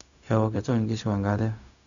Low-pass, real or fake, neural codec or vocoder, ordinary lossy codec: 7.2 kHz; fake; codec, 16 kHz, 0.4 kbps, LongCat-Audio-Codec; none